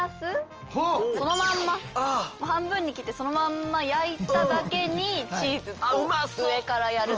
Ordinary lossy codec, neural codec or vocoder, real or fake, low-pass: Opus, 24 kbps; none; real; 7.2 kHz